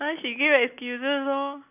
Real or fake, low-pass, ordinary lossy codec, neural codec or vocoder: real; 3.6 kHz; none; none